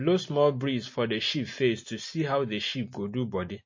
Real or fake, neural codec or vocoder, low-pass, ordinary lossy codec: real; none; 7.2 kHz; MP3, 32 kbps